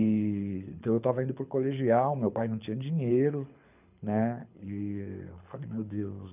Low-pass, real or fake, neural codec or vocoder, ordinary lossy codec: 3.6 kHz; fake; codec, 24 kHz, 6 kbps, HILCodec; none